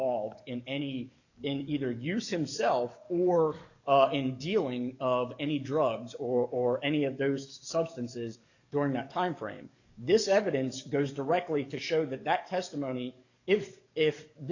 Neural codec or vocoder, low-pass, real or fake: codec, 44.1 kHz, 7.8 kbps, DAC; 7.2 kHz; fake